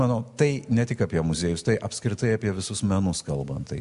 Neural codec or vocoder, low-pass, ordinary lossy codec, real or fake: none; 10.8 kHz; MP3, 64 kbps; real